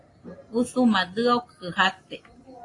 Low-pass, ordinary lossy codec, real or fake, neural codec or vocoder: 10.8 kHz; AAC, 32 kbps; real; none